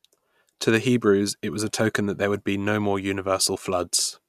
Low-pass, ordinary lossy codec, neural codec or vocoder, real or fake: 14.4 kHz; none; none; real